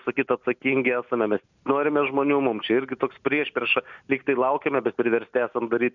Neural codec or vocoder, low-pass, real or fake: none; 7.2 kHz; real